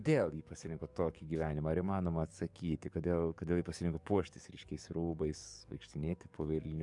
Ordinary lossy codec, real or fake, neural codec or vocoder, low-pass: MP3, 96 kbps; fake; codec, 44.1 kHz, 7.8 kbps, DAC; 10.8 kHz